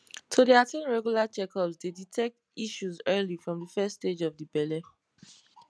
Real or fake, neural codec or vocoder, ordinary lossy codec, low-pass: real; none; none; none